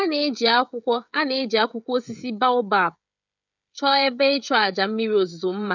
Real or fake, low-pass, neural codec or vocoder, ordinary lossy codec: fake; 7.2 kHz; codec, 16 kHz, 16 kbps, FreqCodec, smaller model; none